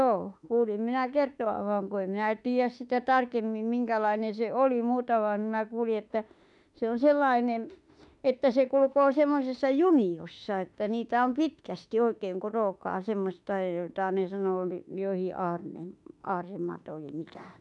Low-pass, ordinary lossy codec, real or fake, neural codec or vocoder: 10.8 kHz; none; fake; autoencoder, 48 kHz, 32 numbers a frame, DAC-VAE, trained on Japanese speech